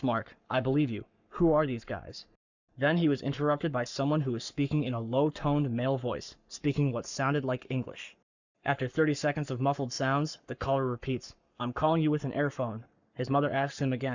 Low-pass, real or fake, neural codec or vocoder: 7.2 kHz; fake; codec, 44.1 kHz, 7.8 kbps, Pupu-Codec